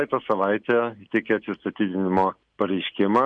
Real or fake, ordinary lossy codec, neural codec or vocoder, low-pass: real; MP3, 64 kbps; none; 9.9 kHz